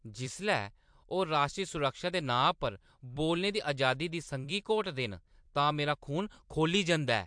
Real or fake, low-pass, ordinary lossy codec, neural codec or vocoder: fake; 9.9 kHz; MP3, 64 kbps; vocoder, 44.1 kHz, 128 mel bands every 256 samples, BigVGAN v2